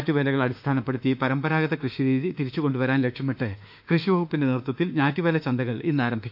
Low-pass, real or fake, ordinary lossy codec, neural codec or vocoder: 5.4 kHz; fake; AAC, 48 kbps; autoencoder, 48 kHz, 32 numbers a frame, DAC-VAE, trained on Japanese speech